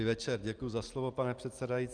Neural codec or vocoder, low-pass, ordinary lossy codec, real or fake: none; 9.9 kHz; Opus, 32 kbps; real